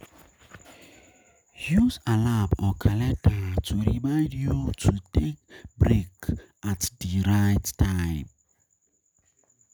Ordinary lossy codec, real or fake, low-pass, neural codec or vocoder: none; real; none; none